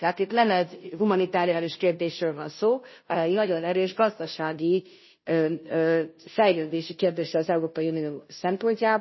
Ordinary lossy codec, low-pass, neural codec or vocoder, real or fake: MP3, 24 kbps; 7.2 kHz; codec, 16 kHz, 0.5 kbps, FunCodec, trained on Chinese and English, 25 frames a second; fake